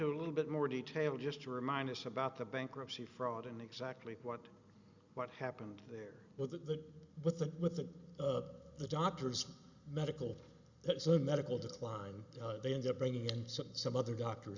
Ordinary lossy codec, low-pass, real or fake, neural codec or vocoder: Opus, 64 kbps; 7.2 kHz; real; none